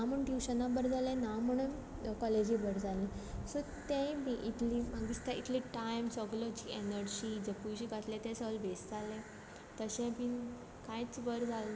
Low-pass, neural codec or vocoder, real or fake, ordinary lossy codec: none; none; real; none